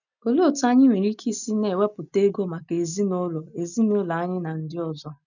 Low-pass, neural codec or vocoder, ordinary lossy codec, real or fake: 7.2 kHz; none; none; real